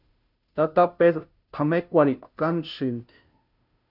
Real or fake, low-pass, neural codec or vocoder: fake; 5.4 kHz; codec, 16 kHz, 0.5 kbps, FunCodec, trained on Chinese and English, 25 frames a second